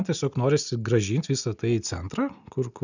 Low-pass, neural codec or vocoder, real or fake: 7.2 kHz; none; real